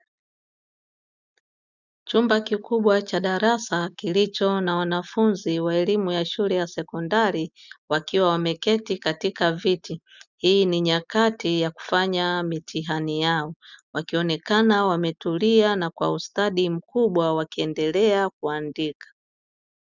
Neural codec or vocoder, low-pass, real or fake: none; 7.2 kHz; real